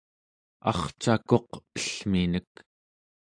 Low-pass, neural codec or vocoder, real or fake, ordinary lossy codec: 9.9 kHz; none; real; AAC, 64 kbps